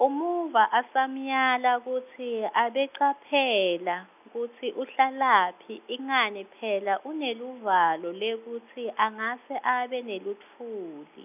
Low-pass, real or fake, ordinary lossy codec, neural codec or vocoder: 3.6 kHz; real; none; none